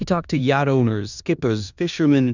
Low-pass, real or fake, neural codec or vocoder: 7.2 kHz; fake; codec, 16 kHz in and 24 kHz out, 0.9 kbps, LongCat-Audio-Codec, four codebook decoder